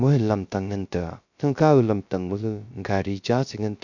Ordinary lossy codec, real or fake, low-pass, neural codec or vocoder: none; fake; 7.2 kHz; codec, 16 kHz, 0.3 kbps, FocalCodec